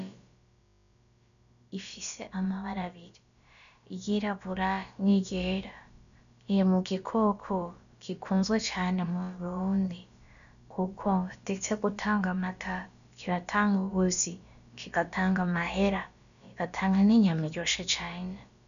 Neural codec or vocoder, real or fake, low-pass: codec, 16 kHz, about 1 kbps, DyCAST, with the encoder's durations; fake; 7.2 kHz